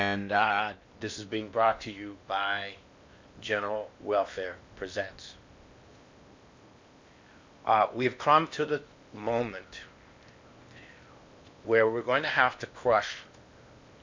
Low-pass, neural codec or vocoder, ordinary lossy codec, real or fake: 7.2 kHz; codec, 16 kHz in and 24 kHz out, 0.8 kbps, FocalCodec, streaming, 65536 codes; MP3, 64 kbps; fake